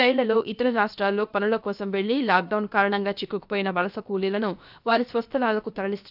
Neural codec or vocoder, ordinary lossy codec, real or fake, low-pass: codec, 16 kHz, about 1 kbps, DyCAST, with the encoder's durations; none; fake; 5.4 kHz